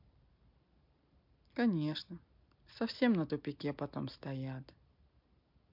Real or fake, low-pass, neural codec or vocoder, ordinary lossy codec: real; 5.4 kHz; none; MP3, 48 kbps